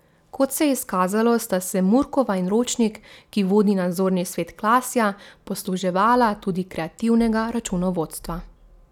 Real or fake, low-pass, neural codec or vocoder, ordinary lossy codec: real; 19.8 kHz; none; none